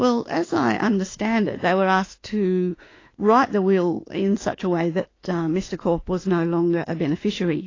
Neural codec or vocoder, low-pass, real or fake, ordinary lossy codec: autoencoder, 48 kHz, 32 numbers a frame, DAC-VAE, trained on Japanese speech; 7.2 kHz; fake; AAC, 32 kbps